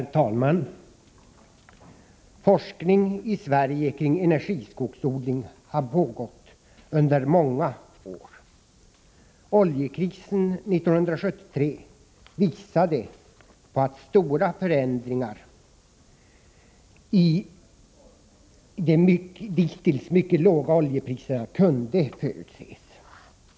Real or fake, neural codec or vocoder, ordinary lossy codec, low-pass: real; none; none; none